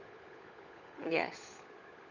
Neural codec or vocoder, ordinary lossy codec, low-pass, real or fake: codec, 16 kHz, 16 kbps, FunCodec, trained on LibriTTS, 50 frames a second; none; 7.2 kHz; fake